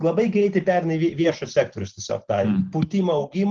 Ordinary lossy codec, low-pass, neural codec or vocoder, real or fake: Opus, 16 kbps; 7.2 kHz; none; real